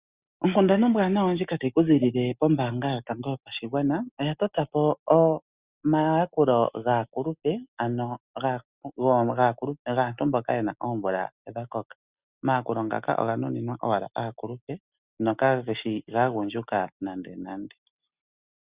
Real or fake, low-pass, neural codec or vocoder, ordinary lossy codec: real; 3.6 kHz; none; Opus, 64 kbps